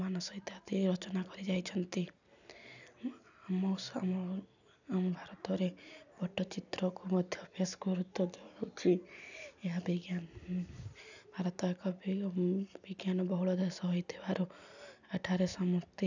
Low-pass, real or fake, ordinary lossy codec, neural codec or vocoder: 7.2 kHz; real; none; none